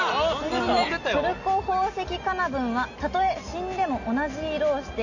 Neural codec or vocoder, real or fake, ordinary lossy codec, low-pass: none; real; none; 7.2 kHz